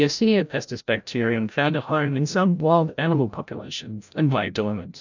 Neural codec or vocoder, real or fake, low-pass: codec, 16 kHz, 0.5 kbps, FreqCodec, larger model; fake; 7.2 kHz